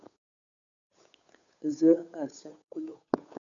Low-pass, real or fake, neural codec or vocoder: 7.2 kHz; fake; codec, 16 kHz, 8 kbps, FunCodec, trained on Chinese and English, 25 frames a second